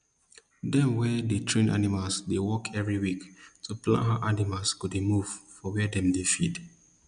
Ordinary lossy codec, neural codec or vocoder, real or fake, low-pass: none; none; real; 9.9 kHz